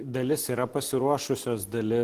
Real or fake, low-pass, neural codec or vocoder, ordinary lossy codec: real; 14.4 kHz; none; Opus, 16 kbps